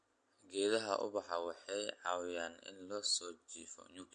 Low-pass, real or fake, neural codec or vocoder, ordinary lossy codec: 9.9 kHz; real; none; MP3, 48 kbps